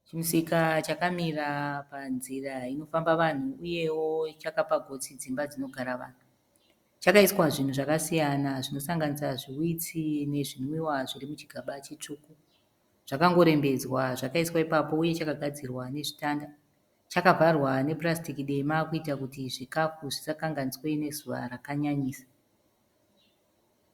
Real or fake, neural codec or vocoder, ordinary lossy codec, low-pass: real; none; Opus, 64 kbps; 19.8 kHz